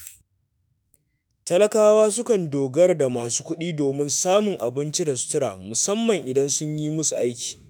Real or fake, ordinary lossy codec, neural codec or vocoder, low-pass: fake; none; autoencoder, 48 kHz, 32 numbers a frame, DAC-VAE, trained on Japanese speech; none